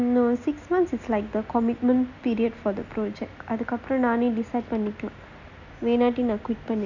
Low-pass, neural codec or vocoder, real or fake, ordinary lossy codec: 7.2 kHz; none; real; none